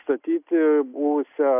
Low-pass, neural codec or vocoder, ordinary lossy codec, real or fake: 3.6 kHz; none; AAC, 32 kbps; real